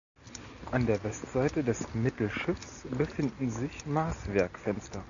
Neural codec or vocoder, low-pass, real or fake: none; 7.2 kHz; real